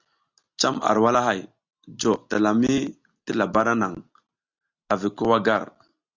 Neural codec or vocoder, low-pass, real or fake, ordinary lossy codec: none; 7.2 kHz; real; Opus, 64 kbps